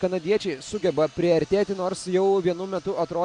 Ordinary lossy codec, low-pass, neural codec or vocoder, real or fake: AAC, 64 kbps; 9.9 kHz; none; real